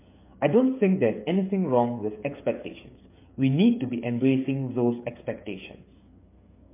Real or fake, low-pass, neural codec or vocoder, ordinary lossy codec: fake; 3.6 kHz; codec, 16 kHz, 8 kbps, FreqCodec, smaller model; MP3, 24 kbps